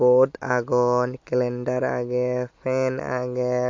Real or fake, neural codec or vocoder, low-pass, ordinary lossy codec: real; none; 7.2 kHz; MP3, 48 kbps